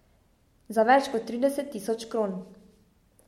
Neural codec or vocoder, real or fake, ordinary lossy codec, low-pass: none; real; MP3, 64 kbps; 19.8 kHz